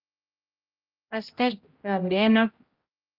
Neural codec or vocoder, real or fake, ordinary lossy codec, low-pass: codec, 16 kHz, 0.5 kbps, X-Codec, HuBERT features, trained on general audio; fake; Opus, 24 kbps; 5.4 kHz